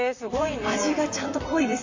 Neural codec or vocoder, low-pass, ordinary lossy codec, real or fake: vocoder, 44.1 kHz, 128 mel bands, Pupu-Vocoder; 7.2 kHz; AAC, 48 kbps; fake